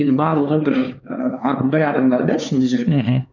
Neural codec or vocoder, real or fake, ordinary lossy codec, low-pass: codec, 16 kHz, 4 kbps, X-Codec, HuBERT features, trained on LibriSpeech; fake; none; 7.2 kHz